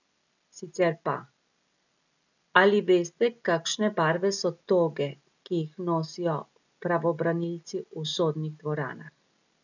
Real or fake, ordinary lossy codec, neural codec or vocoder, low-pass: real; none; none; 7.2 kHz